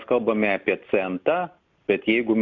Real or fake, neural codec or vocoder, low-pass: real; none; 7.2 kHz